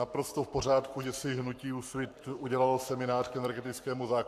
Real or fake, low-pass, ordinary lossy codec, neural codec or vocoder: fake; 14.4 kHz; AAC, 96 kbps; codec, 44.1 kHz, 7.8 kbps, Pupu-Codec